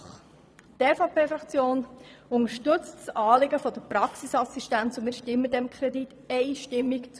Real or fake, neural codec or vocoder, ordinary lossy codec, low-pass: fake; vocoder, 22.05 kHz, 80 mel bands, Vocos; none; none